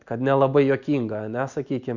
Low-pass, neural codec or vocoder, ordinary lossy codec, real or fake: 7.2 kHz; none; Opus, 64 kbps; real